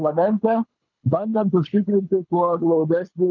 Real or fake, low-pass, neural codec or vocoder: fake; 7.2 kHz; codec, 24 kHz, 6 kbps, HILCodec